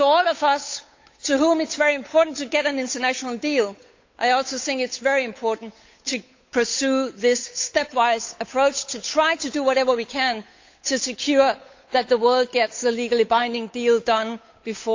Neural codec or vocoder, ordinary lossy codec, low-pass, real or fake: codec, 16 kHz, 8 kbps, FunCodec, trained on Chinese and English, 25 frames a second; AAC, 48 kbps; 7.2 kHz; fake